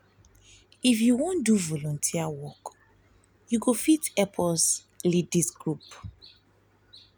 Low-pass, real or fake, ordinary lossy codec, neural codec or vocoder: none; real; none; none